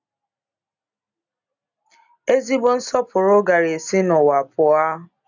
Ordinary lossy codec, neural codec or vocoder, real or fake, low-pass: none; none; real; 7.2 kHz